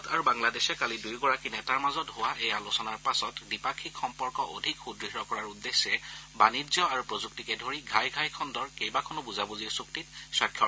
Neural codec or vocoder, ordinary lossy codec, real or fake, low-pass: none; none; real; none